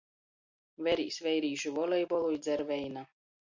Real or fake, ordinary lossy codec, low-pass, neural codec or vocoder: real; MP3, 48 kbps; 7.2 kHz; none